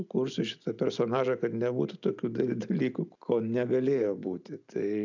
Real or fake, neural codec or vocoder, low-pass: real; none; 7.2 kHz